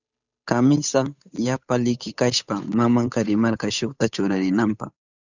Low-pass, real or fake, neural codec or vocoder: 7.2 kHz; fake; codec, 16 kHz, 8 kbps, FunCodec, trained on Chinese and English, 25 frames a second